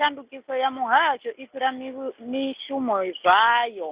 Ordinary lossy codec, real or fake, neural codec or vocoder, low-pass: Opus, 64 kbps; real; none; 3.6 kHz